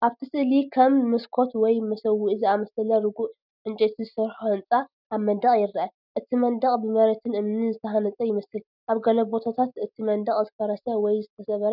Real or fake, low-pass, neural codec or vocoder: real; 5.4 kHz; none